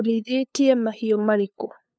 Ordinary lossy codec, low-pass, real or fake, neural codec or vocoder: none; none; fake; codec, 16 kHz, 2 kbps, FunCodec, trained on LibriTTS, 25 frames a second